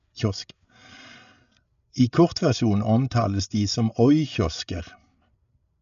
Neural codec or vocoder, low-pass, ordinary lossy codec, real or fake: codec, 16 kHz, 8 kbps, FreqCodec, larger model; 7.2 kHz; none; fake